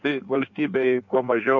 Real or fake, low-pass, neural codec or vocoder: fake; 7.2 kHz; codec, 16 kHz in and 24 kHz out, 1.1 kbps, FireRedTTS-2 codec